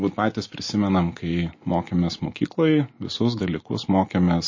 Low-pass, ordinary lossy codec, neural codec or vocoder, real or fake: 7.2 kHz; MP3, 32 kbps; none; real